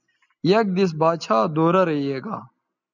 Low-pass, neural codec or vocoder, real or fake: 7.2 kHz; none; real